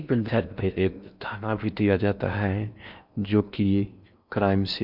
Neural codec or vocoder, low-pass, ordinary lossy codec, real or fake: codec, 16 kHz in and 24 kHz out, 0.6 kbps, FocalCodec, streaming, 4096 codes; 5.4 kHz; none; fake